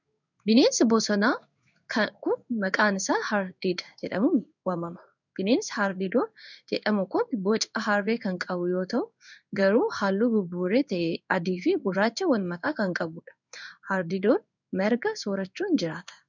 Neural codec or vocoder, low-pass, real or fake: codec, 16 kHz in and 24 kHz out, 1 kbps, XY-Tokenizer; 7.2 kHz; fake